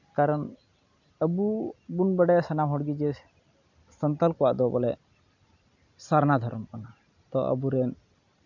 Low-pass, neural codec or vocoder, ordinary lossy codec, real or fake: 7.2 kHz; none; none; real